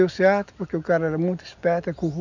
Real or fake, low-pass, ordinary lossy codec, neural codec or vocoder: real; 7.2 kHz; none; none